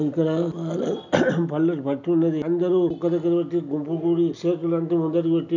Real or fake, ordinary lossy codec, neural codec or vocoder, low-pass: real; none; none; 7.2 kHz